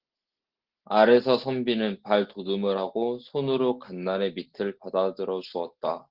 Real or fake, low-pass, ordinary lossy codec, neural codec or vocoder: real; 5.4 kHz; Opus, 16 kbps; none